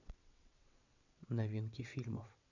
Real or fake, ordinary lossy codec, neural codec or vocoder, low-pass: fake; none; vocoder, 22.05 kHz, 80 mel bands, WaveNeXt; 7.2 kHz